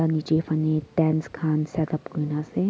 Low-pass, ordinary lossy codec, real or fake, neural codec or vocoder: none; none; real; none